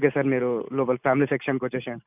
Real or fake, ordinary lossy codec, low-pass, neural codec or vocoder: real; none; 3.6 kHz; none